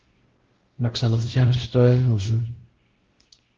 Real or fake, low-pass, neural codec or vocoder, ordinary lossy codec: fake; 7.2 kHz; codec, 16 kHz, 0.5 kbps, X-Codec, WavLM features, trained on Multilingual LibriSpeech; Opus, 16 kbps